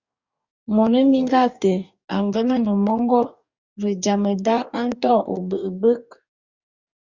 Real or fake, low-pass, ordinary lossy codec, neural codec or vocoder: fake; 7.2 kHz; Opus, 64 kbps; codec, 44.1 kHz, 2.6 kbps, DAC